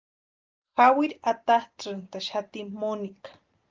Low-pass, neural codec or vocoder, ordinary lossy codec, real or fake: 7.2 kHz; none; Opus, 32 kbps; real